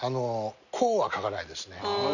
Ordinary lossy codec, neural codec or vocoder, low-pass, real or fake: none; none; 7.2 kHz; real